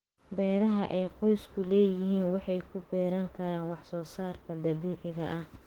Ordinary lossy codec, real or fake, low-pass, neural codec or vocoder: Opus, 16 kbps; fake; 19.8 kHz; autoencoder, 48 kHz, 32 numbers a frame, DAC-VAE, trained on Japanese speech